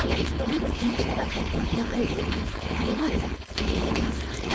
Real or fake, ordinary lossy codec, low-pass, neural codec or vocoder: fake; none; none; codec, 16 kHz, 4.8 kbps, FACodec